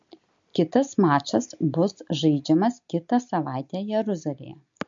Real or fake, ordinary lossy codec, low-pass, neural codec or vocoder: real; MP3, 48 kbps; 7.2 kHz; none